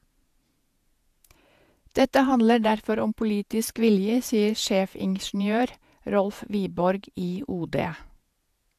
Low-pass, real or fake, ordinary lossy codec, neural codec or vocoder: 14.4 kHz; fake; AAC, 96 kbps; vocoder, 48 kHz, 128 mel bands, Vocos